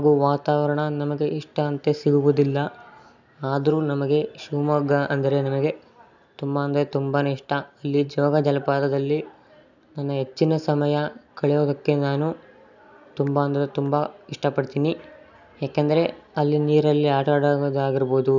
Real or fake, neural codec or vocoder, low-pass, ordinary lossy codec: real; none; 7.2 kHz; none